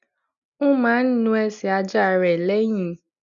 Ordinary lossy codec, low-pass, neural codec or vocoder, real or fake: none; 7.2 kHz; none; real